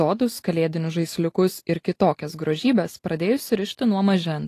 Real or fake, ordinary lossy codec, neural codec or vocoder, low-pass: real; AAC, 48 kbps; none; 14.4 kHz